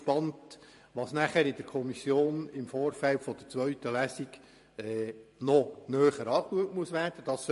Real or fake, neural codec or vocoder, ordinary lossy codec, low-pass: fake; vocoder, 44.1 kHz, 128 mel bands every 512 samples, BigVGAN v2; MP3, 48 kbps; 14.4 kHz